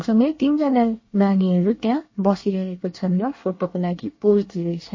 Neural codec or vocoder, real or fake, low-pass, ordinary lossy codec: codec, 24 kHz, 1 kbps, SNAC; fake; 7.2 kHz; MP3, 32 kbps